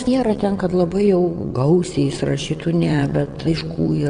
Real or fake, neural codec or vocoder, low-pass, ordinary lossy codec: fake; vocoder, 22.05 kHz, 80 mel bands, WaveNeXt; 9.9 kHz; Opus, 64 kbps